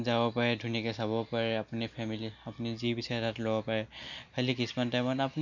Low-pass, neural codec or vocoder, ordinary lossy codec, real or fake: 7.2 kHz; none; none; real